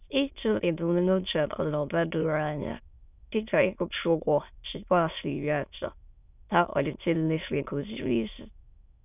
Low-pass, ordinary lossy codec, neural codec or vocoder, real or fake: 3.6 kHz; none; autoencoder, 22.05 kHz, a latent of 192 numbers a frame, VITS, trained on many speakers; fake